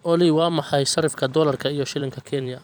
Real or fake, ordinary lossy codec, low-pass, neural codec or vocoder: fake; none; none; vocoder, 44.1 kHz, 128 mel bands every 256 samples, BigVGAN v2